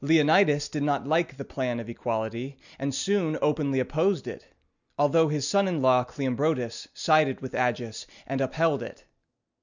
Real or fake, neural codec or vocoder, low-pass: real; none; 7.2 kHz